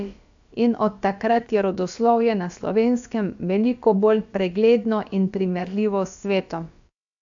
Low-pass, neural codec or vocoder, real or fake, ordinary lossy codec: 7.2 kHz; codec, 16 kHz, about 1 kbps, DyCAST, with the encoder's durations; fake; AAC, 64 kbps